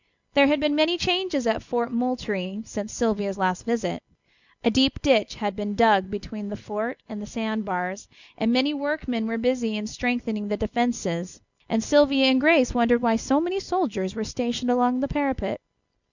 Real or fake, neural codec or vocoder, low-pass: real; none; 7.2 kHz